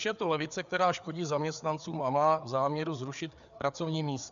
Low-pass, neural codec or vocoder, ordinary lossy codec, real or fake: 7.2 kHz; codec, 16 kHz, 4 kbps, FreqCodec, larger model; MP3, 96 kbps; fake